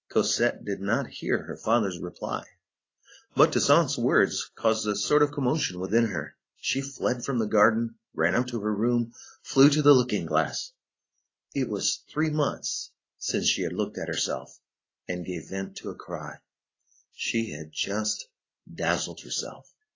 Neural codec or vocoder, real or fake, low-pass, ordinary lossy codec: none; real; 7.2 kHz; AAC, 32 kbps